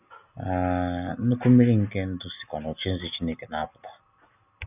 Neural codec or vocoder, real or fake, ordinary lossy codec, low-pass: none; real; none; 3.6 kHz